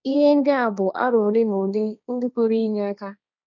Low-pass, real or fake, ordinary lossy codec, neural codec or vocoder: 7.2 kHz; fake; none; codec, 16 kHz, 1.1 kbps, Voila-Tokenizer